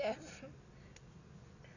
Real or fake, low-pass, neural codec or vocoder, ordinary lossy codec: real; 7.2 kHz; none; none